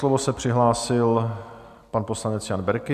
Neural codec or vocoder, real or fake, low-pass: none; real; 14.4 kHz